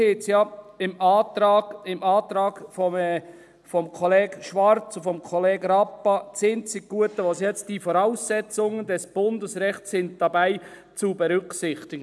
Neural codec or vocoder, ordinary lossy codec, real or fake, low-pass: none; none; real; none